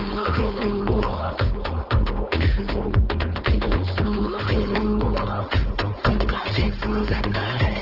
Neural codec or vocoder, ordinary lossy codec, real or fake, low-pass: codec, 16 kHz, 4.8 kbps, FACodec; Opus, 16 kbps; fake; 5.4 kHz